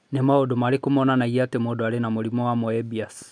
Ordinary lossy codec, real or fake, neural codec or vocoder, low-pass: none; real; none; 9.9 kHz